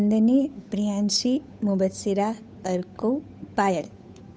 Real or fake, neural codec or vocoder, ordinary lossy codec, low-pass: fake; codec, 16 kHz, 8 kbps, FunCodec, trained on Chinese and English, 25 frames a second; none; none